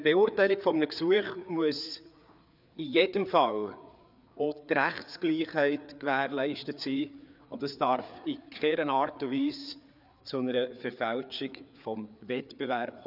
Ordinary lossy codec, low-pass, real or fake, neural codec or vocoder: none; 5.4 kHz; fake; codec, 16 kHz, 4 kbps, FreqCodec, larger model